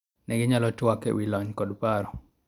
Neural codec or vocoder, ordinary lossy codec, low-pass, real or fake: none; none; 19.8 kHz; real